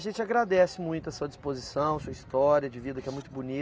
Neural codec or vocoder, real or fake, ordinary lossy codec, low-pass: none; real; none; none